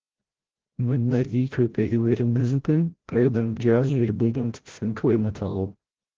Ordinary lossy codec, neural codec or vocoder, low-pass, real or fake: Opus, 16 kbps; codec, 16 kHz, 0.5 kbps, FreqCodec, larger model; 7.2 kHz; fake